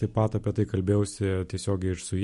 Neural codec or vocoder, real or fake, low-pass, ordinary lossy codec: none; real; 14.4 kHz; MP3, 48 kbps